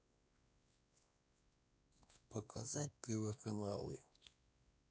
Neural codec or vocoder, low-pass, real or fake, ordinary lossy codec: codec, 16 kHz, 2 kbps, X-Codec, WavLM features, trained on Multilingual LibriSpeech; none; fake; none